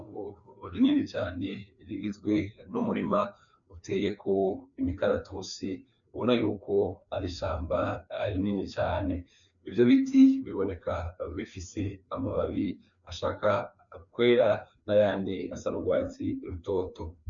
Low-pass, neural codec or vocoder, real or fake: 7.2 kHz; codec, 16 kHz, 2 kbps, FreqCodec, larger model; fake